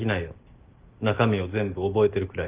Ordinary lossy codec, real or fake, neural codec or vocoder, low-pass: Opus, 32 kbps; real; none; 3.6 kHz